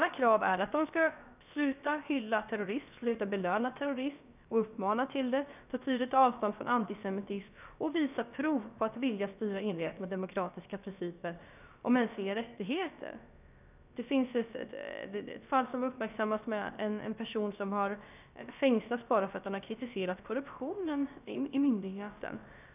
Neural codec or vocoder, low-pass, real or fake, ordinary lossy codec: codec, 16 kHz, about 1 kbps, DyCAST, with the encoder's durations; 3.6 kHz; fake; none